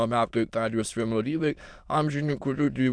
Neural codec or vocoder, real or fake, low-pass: autoencoder, 22.05 kHz, a latent of 192 numbers a frame, VITS, trained on many speakers; fake; 9.9 kHz